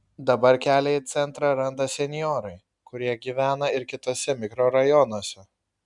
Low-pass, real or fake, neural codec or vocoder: 10.8 kHz; real; none